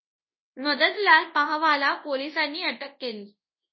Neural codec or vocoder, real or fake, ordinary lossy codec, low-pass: codec, 24 kHz, 0.9 kbps, WavTokenizer, large speech release; fake; MP3, 24 kbps; 7.2 kHz